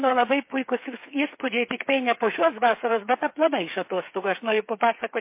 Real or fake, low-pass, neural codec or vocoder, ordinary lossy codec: fake; 3.6 kHz; codec, 16 kHz, 8 kbps, FreqCodec, smaller model; MP3, 24 kbps